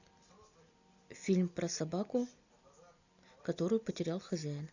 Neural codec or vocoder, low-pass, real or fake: none; 7.2 kHz; real